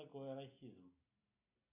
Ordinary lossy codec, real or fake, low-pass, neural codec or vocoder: AAC, 32 kbps; real; 3.6 kHz; none